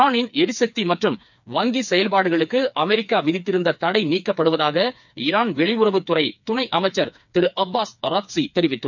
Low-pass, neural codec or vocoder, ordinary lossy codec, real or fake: 7.2 kHz; codec, 16 kHz, 4 kbps, FreqCodec, smaller model; none; fake